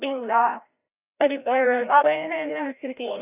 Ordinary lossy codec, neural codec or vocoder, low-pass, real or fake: none; codec, 16 kHz, 0.5 kbps, FreqCodec, larger model; 3.6 kHz; fake